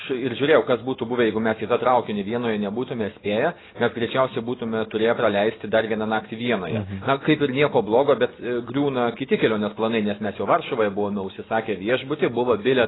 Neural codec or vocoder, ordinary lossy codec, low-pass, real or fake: none; AAC, 16 kbps; 7.2 kHz; real